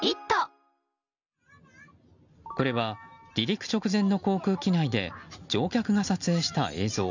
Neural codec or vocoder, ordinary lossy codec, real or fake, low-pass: none; none; real; 7.2 kHz